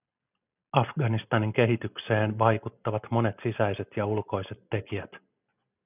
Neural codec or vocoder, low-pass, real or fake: none; 3.6 kHz; real